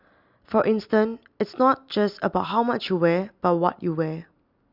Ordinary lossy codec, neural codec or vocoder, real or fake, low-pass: Opus, 64 kbps; none; real; 5.4 kHz